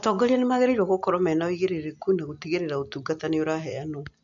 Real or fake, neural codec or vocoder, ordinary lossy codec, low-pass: real; none; none; 7.2 kHz